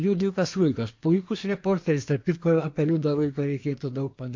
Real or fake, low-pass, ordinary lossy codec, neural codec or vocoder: fake; 7.2 kHz; MP3, 48 kbps; codec, 24 kHz, 1 kbps, SNAC